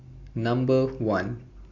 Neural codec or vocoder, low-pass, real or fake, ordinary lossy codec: none; 7.2 kHz; real; MP3, 48 kbps